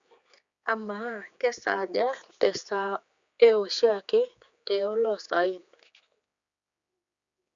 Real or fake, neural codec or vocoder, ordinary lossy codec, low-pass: fake; codec, 16 kHz, 4 kbps, X-Codec, HuBERT features, trained on general audio; Opus, 64 kbps; 7.2 kHz